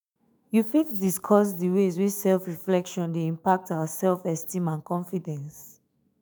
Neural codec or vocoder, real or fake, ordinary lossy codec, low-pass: autoencoder, 48 kHz, 128 numbers a frame, DAC-VAE, trained on Japanese speech; fake; none; none